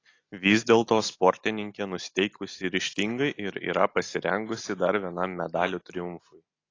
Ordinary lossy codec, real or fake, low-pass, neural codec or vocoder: AAC, 32 kbps; real; 7.2 kHz; none